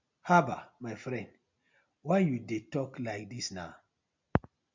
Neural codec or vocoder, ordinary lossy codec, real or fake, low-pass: none; MP3, 64 kbps; real; 7.2 kHz